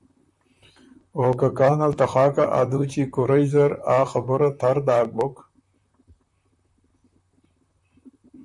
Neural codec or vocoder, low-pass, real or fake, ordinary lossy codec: vocoder, 44.1 kHz, 128 mel bands, Pupu-Vocoder; 10.8 kHz; fake; MP3, 96 kbps